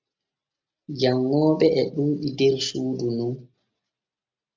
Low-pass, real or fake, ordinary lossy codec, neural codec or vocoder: 7.2 kHz; real; MP3, 64 kbps; none